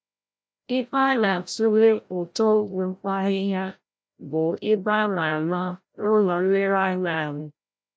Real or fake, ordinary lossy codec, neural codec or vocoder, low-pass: fake; none; codec, 16 kHz, 0.5 kbps, FreqCodec, larger model; none